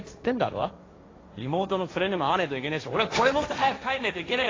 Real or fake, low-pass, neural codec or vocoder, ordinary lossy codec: fake; 7.2 kHz; codec, 16 kHz, 1.1 kbps, Voila-Tokenizer; AAC, 32 kbps